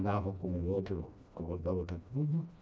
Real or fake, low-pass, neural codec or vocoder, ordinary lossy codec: fake; none; codec, 16 kHz, 1 kbps, FreqCodec, smaller model; none